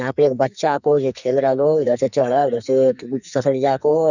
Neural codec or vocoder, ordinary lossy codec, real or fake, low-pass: codec, 44.1 kHz, 2.6 kbps, SNAC; none; fake; 7.2 kHz